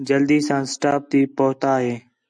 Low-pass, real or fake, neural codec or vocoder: 9.9 kHz; real; none